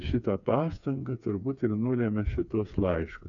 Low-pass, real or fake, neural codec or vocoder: 7.2 kHz; fake; codec, 16 kHz, 4 kbps, FreqCodec, smaller model